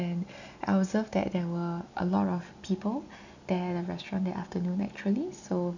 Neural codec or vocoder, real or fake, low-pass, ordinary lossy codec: none; real; 7.2 kHz; none